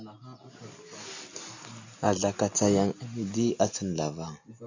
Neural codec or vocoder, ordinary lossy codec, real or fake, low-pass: none; AAC, 48 kbps; real; 7.2 kHz